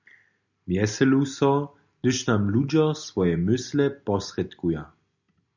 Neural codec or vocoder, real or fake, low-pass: none; real; 7.2 kHz